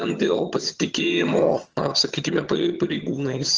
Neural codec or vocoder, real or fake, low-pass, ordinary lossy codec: vocoder, 22.05 kHz, 80 mel bands, HiFi-GAN; fake; 7.2 kHz; Opus, 24 kbps